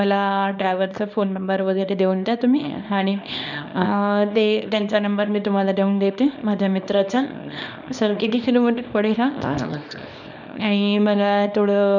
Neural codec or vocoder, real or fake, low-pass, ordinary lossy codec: codec, 24 kHz, 0.9 kbps, WavTokenizer, small release; fake; 7.2 kHz; none